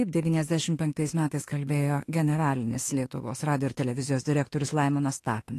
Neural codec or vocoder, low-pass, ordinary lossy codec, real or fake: autoencoder, 48 kHz, 32 numbers a frame, DAC-VAE, trained on Japanese speech; 14.4 kHz; AAC, 48 kbps; fake